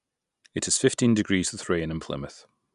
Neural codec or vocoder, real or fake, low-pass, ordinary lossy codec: none; real; 10.8 kHz; none